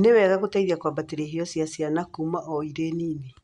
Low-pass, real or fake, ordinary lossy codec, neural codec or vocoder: 10.8 kHz; real; Opus, 64 kbps; none